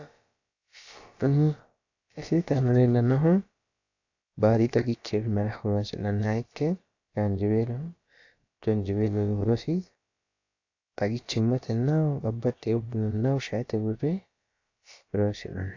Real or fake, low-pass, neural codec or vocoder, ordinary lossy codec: fake; 7.2 kHz; codec, 16 kHz, about 1 kbps, DyCAST, with the encoder's durations; AAC, 48 kbps